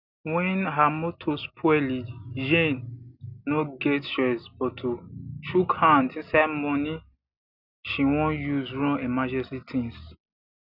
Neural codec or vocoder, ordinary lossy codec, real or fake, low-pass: none; none; real; 5.4 kHz